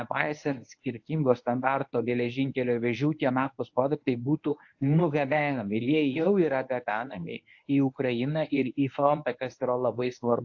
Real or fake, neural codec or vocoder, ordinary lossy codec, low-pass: fake; codec, 24 kHz, 0.9 kbps, WavTokenizer, medium speech release version 1; AAC, 48 kbps; 7.2 kHz